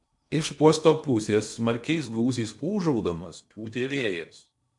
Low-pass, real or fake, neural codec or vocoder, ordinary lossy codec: 10.8 kHz; fake; codec, 16 kHz in and 24 kHz out, 0.8 kbps, FocalCodec, streaming, 65536 codes; MP3, 64 kbps